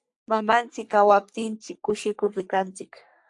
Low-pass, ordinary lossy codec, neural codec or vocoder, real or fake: 10.8 kHz; AAC, 64 kbps; codec, 44.1 kHz, 3.4 kbps, Pupu-Codec; fake